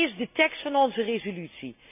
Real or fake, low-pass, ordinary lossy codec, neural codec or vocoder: real; 3.6 kHz; none; none